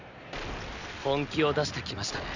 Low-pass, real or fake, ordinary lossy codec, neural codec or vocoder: 7.2 kHz; real; none; none